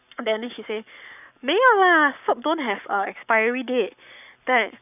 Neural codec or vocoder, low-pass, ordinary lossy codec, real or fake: codec, 44.1 kHz, 7.8 kbps, Pupu-Codec; 3.6 kHz; none; fake